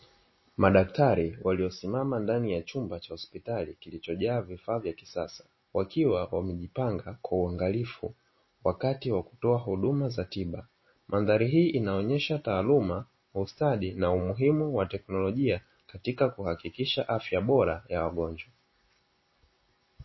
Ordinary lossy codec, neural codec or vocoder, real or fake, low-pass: MP3, 24 kbps; none; real; 7.2 kHz